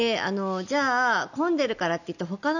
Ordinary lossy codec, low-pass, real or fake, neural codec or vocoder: none; 7.2 kHz; real; none